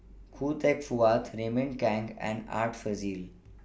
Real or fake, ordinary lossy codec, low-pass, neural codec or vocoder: real; none; none; none